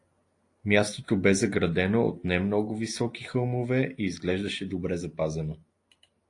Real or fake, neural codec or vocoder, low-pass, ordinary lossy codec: real; none; 10.8 kHz; AAC, 48 kbps